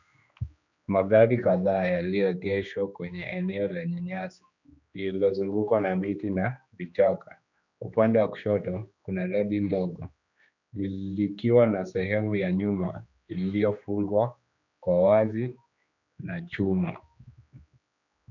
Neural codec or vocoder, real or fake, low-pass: codec, 16 kHz, 2 kbps, X-Codec, HuBERT features, trained on general audio; fake; 7.2 kHz